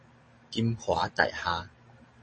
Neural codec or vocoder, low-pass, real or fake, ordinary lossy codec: none; 10.8 kHz; real; MP3, 32 kbps